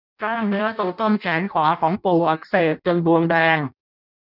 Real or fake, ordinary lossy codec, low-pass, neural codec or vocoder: fake; none; 5.4 kHz; codec, 16 kHz in and 24 kHz out, 0.6 kbps, FireRedTTS-2 codec